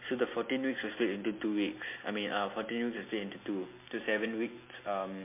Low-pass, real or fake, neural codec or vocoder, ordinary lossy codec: 3.6 kHz; real; none; MP3, 24 kbps